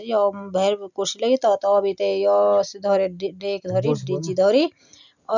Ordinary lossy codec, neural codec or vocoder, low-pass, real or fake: none; none; 7.2 kHz; real